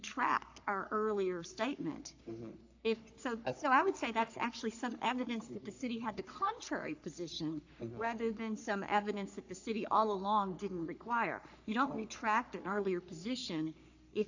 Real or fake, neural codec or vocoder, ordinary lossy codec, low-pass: fake; codec, 44.1 kHz, 3.4 kbps, Pupu-Codec; AAC, 48 kbps; 7.2 kHz